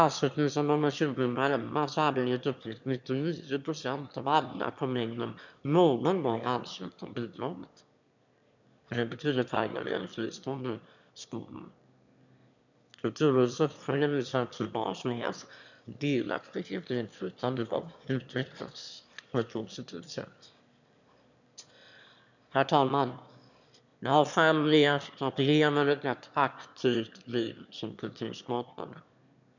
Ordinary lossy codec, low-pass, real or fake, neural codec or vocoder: none; 7.2 kHz; fake; autoencoder, 22.05 kHz, a latent of 192 numbers a frame, VITS, trained on one speaker